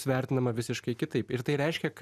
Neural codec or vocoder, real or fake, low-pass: none; real; 14.4 kHz